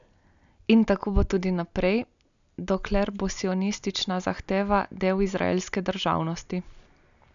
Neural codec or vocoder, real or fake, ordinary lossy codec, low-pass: none; real; none; 7.2 kHz